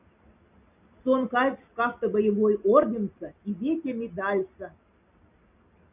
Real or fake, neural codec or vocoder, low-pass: real; none; 3.6 kHz